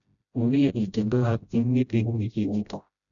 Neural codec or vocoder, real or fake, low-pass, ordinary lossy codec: codec, 16 kHz, 0.5 kbps, FreqCodec, smaller model; fake; 7.2 kHz; none